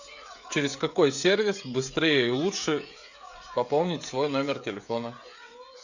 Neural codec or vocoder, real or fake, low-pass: codec, 16 kHz, 16 kbps, FreqCodec, smaller model; fake; 7.2 kHz